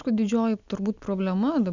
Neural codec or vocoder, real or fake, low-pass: none; real; 7.2 kHz